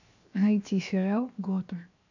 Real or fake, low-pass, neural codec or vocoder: fake; 7.2 kHz; codec, 16 kHz, 0.7 kbps, FocalCodec